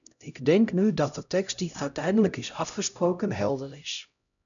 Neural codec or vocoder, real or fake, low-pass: codec, 16 kHz, 0.5 kbps, X-Codec, HuBERT features, trained on LibriSpeech; fake; 7.2 kHz